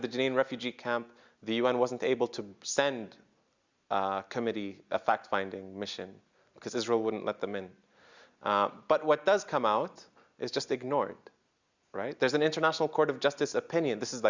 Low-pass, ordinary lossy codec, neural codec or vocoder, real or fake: 7.2 kHz; Opus, 64 kbps; none; real